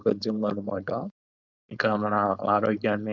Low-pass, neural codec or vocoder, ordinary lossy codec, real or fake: 7.2 kHz; codec, 16 kHz, 4.8 kbps, FACodec; none; fake